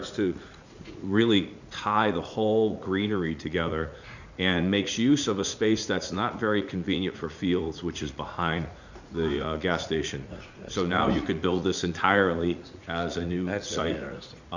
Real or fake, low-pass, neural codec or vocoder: fake; 7.2 kHz; vocoder, 44.1 kHz, 80 mel bands, Vocos